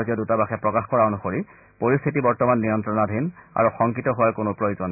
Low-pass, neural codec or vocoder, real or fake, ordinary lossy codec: 3.6 kHz; none; real; none